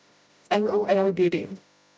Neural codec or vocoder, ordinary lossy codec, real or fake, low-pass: codec, 16 kHz, 0.5 kbps, FreqCodec, smaller model; none; fake; none